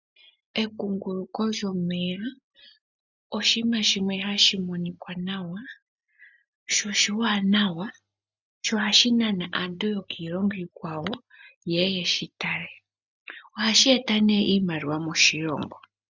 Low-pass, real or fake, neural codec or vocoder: 7.2 kHz; real; none